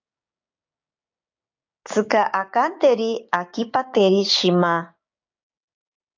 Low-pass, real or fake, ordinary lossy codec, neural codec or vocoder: 7.2 kHz; fake; AAC, 48 kbps; codec, 16 kHz, 6 kbps, DAC